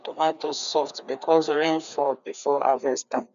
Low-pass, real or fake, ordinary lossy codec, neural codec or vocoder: 7.2 kHz; fake; none; codec, 16 kHz, 2 kbps, FreqCodec, larger model